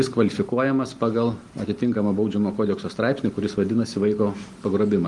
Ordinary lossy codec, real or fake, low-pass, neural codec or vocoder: Opus, 24 kbps; real; 10.8 kHz; none